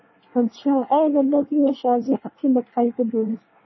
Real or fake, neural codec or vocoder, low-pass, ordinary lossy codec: fake; codec, 24 kHz, 1 kbps, SNAC; 7.2 kHz; MP3, 24 kbps